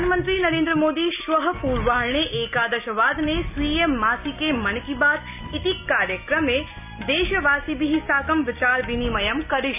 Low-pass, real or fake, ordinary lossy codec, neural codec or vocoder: 3.6 kHz; real; none; none